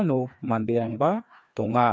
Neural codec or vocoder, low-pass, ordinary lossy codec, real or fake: codec, 16 kHz, 2 kbps, FreqCodec, larger model; none; none; fake